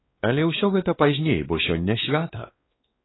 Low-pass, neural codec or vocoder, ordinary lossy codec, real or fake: 7.2 kHz; codec, 16 kHz, 2 kbps, X-Codec, WavLM features, trained on Multilingual LibriSpeech; AAC, 16 kbps; fake